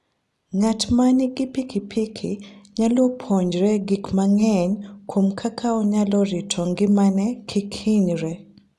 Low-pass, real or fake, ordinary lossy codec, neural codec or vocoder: none; real; none; none